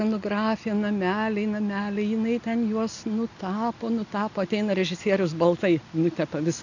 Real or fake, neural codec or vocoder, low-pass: real; none; 7.2 kHz